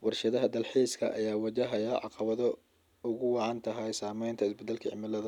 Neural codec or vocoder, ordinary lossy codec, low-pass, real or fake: none; none; 19.8 kHz; real